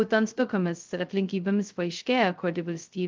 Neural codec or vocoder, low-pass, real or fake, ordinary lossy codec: codec, 16 kHz, 0.2 kbps, FocalCodec; 7.2 kHz; fake; Opus, 32 kbps